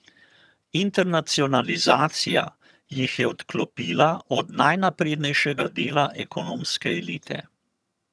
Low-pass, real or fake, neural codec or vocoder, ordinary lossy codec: none; fake; vocoder, 22.05 kHz, 80 mel bands, HiFi-GAN; none